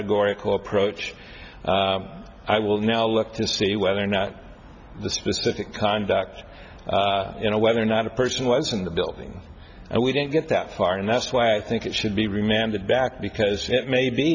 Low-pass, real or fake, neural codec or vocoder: 7.2 kHz; real; none